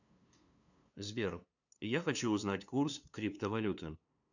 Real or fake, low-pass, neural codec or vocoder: fake; 7.2 kHz; codec, 16 kHz, 2 kbps, FunCodec, trained on LibriTTS, 25 frames a second